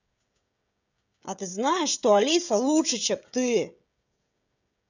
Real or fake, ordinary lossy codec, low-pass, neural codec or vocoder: fake; none; 7.2 kHz; codec, 16 kHz, 16 kbps, FreqCodec, smaller model